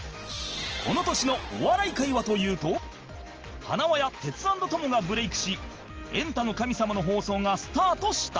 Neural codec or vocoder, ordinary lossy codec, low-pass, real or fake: none; Opus, 16 kbps; 7.2 kHz; real